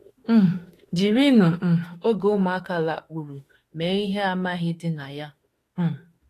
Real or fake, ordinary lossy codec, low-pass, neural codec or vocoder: fake; AAC, 48 kbps; 14.4 kHz; autoencoder, 48 kHz, 32 numbers a frame, DAC-VAE, trained on Japanese speech